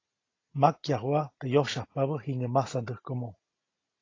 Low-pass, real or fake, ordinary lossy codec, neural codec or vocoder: 7.2 kHz; real; AAC, 32 kbps; none